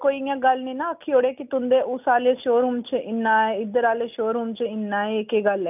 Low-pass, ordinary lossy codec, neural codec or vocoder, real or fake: 3.6 kHz; none; none; real